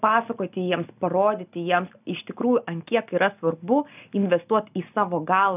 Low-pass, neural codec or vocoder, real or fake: 3.6 kHz; none; real